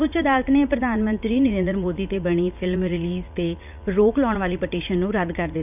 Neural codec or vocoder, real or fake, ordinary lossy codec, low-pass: vocoder, 44.1 kHz, 80 mel bands, Vocos; fake; none; 3.6 kHz